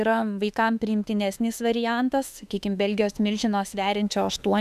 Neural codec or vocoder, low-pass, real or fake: autoencoder, 48 kHz, 32 numbers a frame, DAC-VAE, trained on Japanese speech; 14.4 kHz; fake